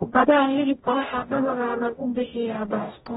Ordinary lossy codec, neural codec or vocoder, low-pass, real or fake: AAC, 16 kbps; codec, 44.1 kHz, 0.9 kbps, DAC; 19.8 kHz; fake